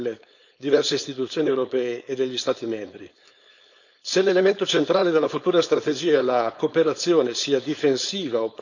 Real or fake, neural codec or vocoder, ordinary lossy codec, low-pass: fake; codec, 16 kHz, 4.8 kbps, FACodec; none; 7.2 kHz